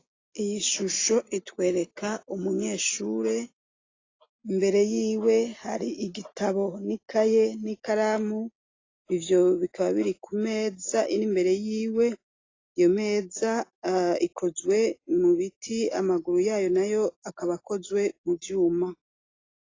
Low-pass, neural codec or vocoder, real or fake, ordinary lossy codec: 7.2 kHz; none; real; AAC, 32 kbps